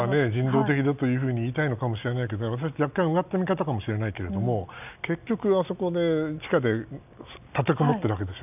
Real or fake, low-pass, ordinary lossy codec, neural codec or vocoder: real; 3.6 kHz; none; none